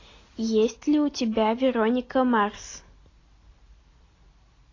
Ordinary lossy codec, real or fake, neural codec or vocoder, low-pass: AAC, 32 kbps; real; none; 7.2 kHz